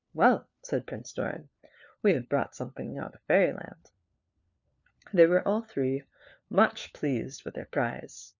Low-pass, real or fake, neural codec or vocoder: 7.2 kHz; fake; codec, 16 kHz, 4 kbps, FunCodec, trained on LibriTTS, 50 frames a second